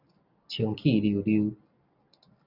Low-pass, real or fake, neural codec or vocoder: 5.4 kHz; real; none